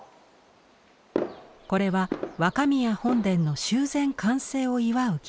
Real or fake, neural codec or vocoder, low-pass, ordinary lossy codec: real; none; none; none